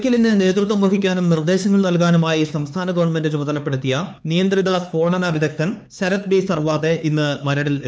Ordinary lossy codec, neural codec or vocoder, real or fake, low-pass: none; codec, 16 kHz, 4 kbps, X-Codec, HuBERT features, trained on LibriSpeech; fake; none